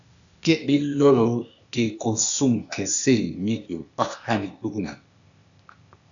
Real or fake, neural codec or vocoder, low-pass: fake; codec, 16 kHz, 0.8 kbps, ZipCodec; 7.2 kHz